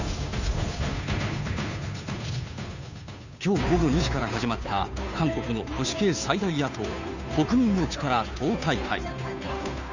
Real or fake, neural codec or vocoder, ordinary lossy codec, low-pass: fake; codec, 16 kHz, 2 kbps, FunCodec, trained on Chinese and English, 25 frames a second; none; 7.2 kHz